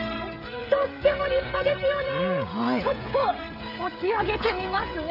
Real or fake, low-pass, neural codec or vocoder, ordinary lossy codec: fake; 5.4 kHz; codec, 16 kHz, 16 kbps, FreqCodec, smaller model; AAC, 48 kbps